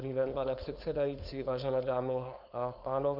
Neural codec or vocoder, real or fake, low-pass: codec, 16 kHz, 4.8 kbps, FACodec; fake; 5.4 kHz